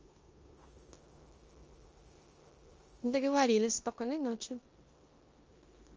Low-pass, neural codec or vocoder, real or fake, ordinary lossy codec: 7.2 kHz; codec, 16 kHz in and 24 kHz out, 0.9 kbps, LongCat-Audio-Codec, four codebook decoder; fake; Opus, 24 kbps